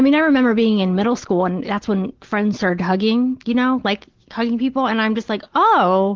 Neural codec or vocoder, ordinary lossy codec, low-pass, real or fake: none; Opus, 16 kbps; 7.2 kHz; real